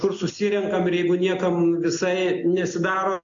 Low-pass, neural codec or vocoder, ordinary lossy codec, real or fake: 7.2 kHz; none; MP3, 64 kbps; real